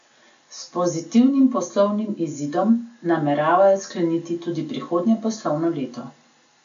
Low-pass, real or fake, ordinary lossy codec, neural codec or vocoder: 7.2 kHz; real; AAC, 64 kbps; none